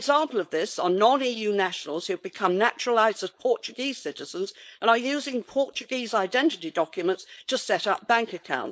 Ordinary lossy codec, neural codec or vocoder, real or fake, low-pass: none; codec, 16 kHz, 4.8 kbps, FACodec; fake; none